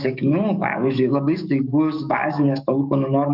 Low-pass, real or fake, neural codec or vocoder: 5.4 kHz; fake; codec, 44.1 kHz, 7.8 kbps, DAC